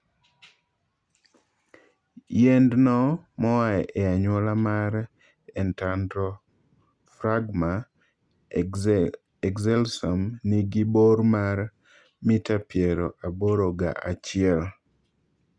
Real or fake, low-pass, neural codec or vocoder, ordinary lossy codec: real; 9.9 kHz; none; Opus, 64 kbps